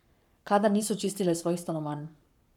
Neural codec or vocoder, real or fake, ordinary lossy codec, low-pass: codec, 44.1 kHz, 7.8 kbps, Pupu-Codec; fake; none; 19.8 kHz